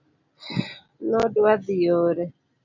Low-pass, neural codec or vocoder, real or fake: 7.2 kHz; none; real